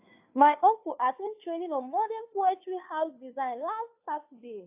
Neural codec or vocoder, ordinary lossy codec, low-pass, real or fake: codec, 16 kHz, 2 kbps, FunCodec, trained on Chinese and English, 25 frames a second; AAC, 32 kbps; 3.6 kHz; fake